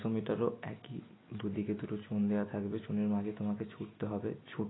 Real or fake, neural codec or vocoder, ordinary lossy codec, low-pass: real; none; AAC, 16 kbps; 7.2 kHz